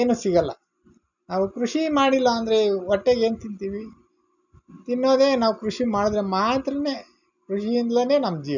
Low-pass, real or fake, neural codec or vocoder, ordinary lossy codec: 7.2 kHz; real; none; none